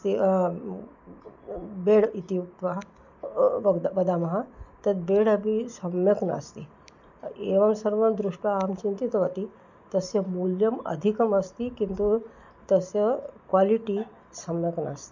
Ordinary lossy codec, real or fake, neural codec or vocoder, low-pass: none; real; none; 7.2 kHz